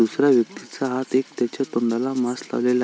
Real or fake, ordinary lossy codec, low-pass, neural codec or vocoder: real; none; none; none